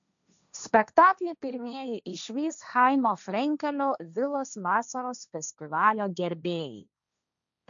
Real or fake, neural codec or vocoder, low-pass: fake; codec, 16 kHz, 1.1 kbps, Voila-Tokenizer; 7.2 kHz